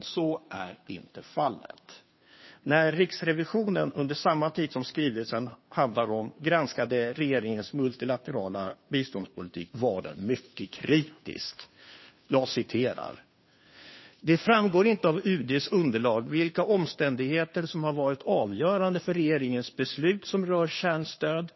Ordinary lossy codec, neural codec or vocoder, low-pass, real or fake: MP3, 24 kbps; codec, 16 kHz, 2 kbps, FunCodec, trained on Chinese and English, 25 frames a second; 7.2 kHz; fake